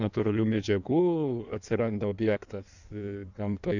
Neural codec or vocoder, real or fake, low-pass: codec, 16 kHz in and 24 kHz out, 1.1 kbps, FireRedTTS-2 codec; fake; 7.2 kHz